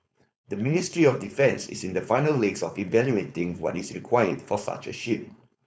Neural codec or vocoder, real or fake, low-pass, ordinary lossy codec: codec, 16 kHz, 4.8 kbps, FACodec; fake; none; none